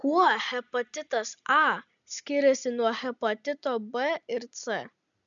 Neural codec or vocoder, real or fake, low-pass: none; real; 7.2 kHz